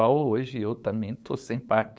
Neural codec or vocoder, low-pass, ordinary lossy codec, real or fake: codec, 16 kHz, 8 kbps, FunCodec, trained on LibriTTS, 25 frames a second; none; none; fake